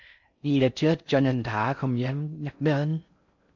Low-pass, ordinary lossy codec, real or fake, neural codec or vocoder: 7.2 kHz; AAC, 48 kbps; fake; codec, 16 kHz in and 24 kHz out, 0.6 kbps, FocalCodec, streaming, 4096 codes